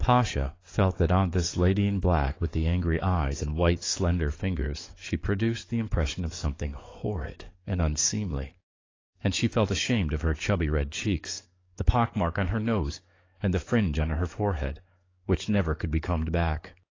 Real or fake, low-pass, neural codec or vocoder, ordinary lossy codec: fake; 7.2 kHz; codec, 44.1 kHz, 7.8 kbps, DAC; AAC, 32 kbps